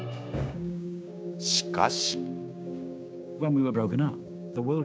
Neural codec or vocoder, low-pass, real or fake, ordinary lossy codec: codec, 16 kHz, 6 kbps, DAC; none; fake; none